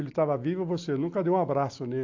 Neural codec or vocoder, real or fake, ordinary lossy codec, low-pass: none; real; AAC, 48 kbps; 7.2 kHz